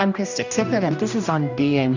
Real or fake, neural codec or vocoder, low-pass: fake; codec, 16 kHz, 1 kbps, X-Codec, HuBERT features, trained on general audio; 7.2 kHz